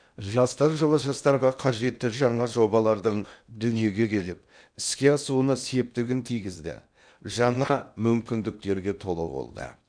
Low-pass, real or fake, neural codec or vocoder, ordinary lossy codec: 9.9 kHz; fake; codec, 16 kHz in and 24 kHz out, 0.8 kbps, FocalCodec, streaming, 65536 codes; none